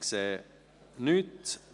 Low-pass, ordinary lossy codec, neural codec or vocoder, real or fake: 10.8 kHz; none; none; real